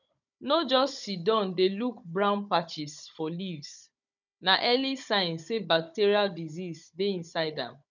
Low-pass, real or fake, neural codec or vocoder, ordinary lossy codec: 7.2 kHz; fake; codec, 16 kHz, 16 kbps, FunCodec, trained on Chinese and English, 50 frames a second; none